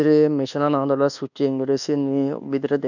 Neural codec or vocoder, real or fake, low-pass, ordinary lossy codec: codec, 24 kHz, 1.2 kbps, DualCodec; fake; 7.2 kHz; none